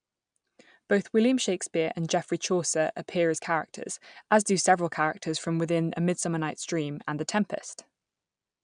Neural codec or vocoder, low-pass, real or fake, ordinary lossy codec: none; 9.9 kHz; real; MP3, 96 kbps